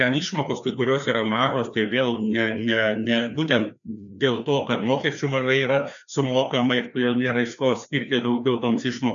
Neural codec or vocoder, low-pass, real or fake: codec, 16 kHz, 2 kbps, FreqCodec, larger model; 7.2 kHz; fake